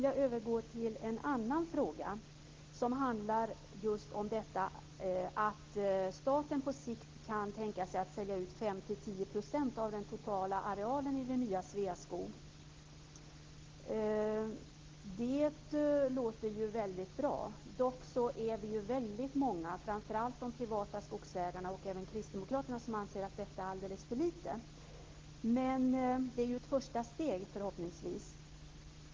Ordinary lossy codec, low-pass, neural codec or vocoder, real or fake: Opus, 16 kbps; 7.2 kHz; none; real